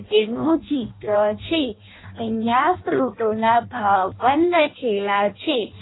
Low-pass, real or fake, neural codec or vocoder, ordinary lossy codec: 7.2 kHz; fake; codec, 16 kHz in and 24 kHz out, 0.6 kbps, FireRedTTS-2 codec; AAC, 16 kbps